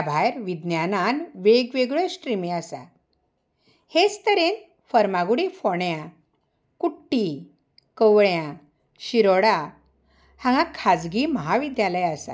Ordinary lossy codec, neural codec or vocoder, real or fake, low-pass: none; none; real; none